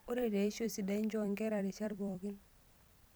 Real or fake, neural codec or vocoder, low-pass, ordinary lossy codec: fake; vocoder, 44.1 kHz, 128 mel bands every 256 samples, BigVGAN v2; none; none